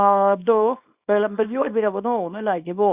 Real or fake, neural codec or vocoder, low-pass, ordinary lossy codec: fake; codec, 24 kHz, 0.9 kbps, WavTokenizer, small release; 3.6 kHz; Opus, 64 kbps